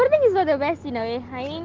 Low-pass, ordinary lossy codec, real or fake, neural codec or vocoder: 7.2 kHz; Opus, 16 kbps; real; none